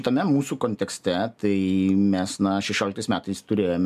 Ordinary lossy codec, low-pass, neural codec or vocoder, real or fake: MP3, 96 kbps; 14.4 kHz; none; real